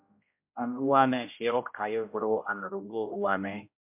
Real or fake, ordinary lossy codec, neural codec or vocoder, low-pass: fake; MP3, 32 kbps; codec, 16 kHz, 0.5 kbps, X-Codec, HuBERT features, trained on general audio; 3.6 kHz